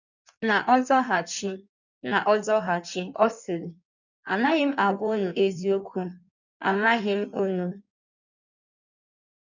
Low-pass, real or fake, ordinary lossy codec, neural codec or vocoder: 7.2 kHz; fake; none; codec, 16 kHz in and 24 kHz out, 1.1 kbps, FireRedTTS-2 codec